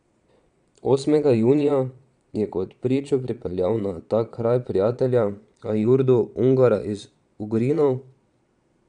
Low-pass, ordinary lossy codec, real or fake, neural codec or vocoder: 9.9 kHz; none; fake; vocoder, 22.05 kHz, 80 mel bands, Vocos